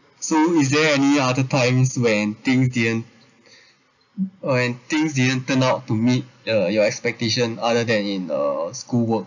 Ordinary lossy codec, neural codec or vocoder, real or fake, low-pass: none; none; real; 7.2 kHz